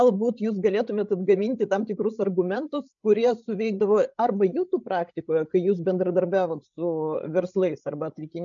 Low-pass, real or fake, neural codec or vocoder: 7.2 kHz; fake; codec, 16 kHz, 8 kbps, FreqCodec, larger model